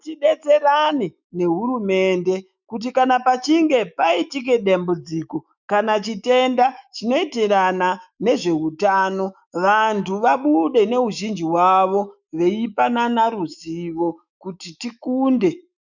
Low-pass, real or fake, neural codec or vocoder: 7.2 kHz; fake; autoencoder, 48 kHz, 128 numbers a frame, DAC-VAE, trained on Japanese speech